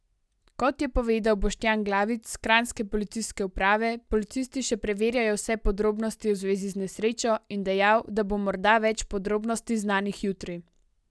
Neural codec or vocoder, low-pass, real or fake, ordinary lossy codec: none; none; real; none